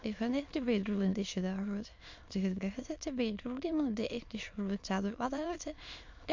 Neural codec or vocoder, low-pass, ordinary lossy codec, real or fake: autoencoder, 22.05 kHz, a latent of 192 numbers a frame, VITS, trained on many speakers; 7.2 kHz; MP3, 48 kbps; fake